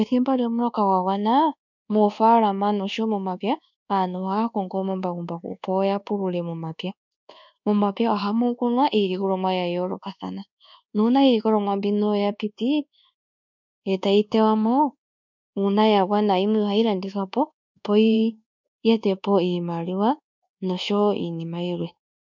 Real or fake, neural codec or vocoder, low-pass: fake; codec, 24 kHz, 1.2 kbps, DualCodec; 7.2 kHz